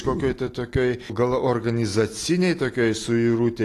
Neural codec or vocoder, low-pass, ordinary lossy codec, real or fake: none; 14.4 kHz; AAC, 48 kbps; real